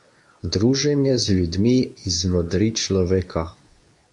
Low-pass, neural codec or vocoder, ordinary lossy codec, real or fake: 10.8 kHz; codec, 24 kHz, 0.9 kbps, WavTokenizer, medium speech release version 2; AAC, 64 kbps; fake